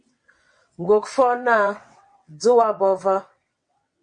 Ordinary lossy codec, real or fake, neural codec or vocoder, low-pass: MP3, 48 kbps; fake; vocoder, 22.05 kHz, 80 mel bands, WaveNeXt; 9.9 kHz